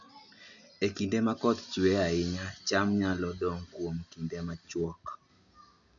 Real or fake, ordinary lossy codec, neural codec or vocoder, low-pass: real; none; none; 7.2 kHz